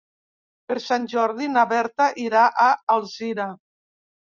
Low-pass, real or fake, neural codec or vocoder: 7.2 kHz; real; none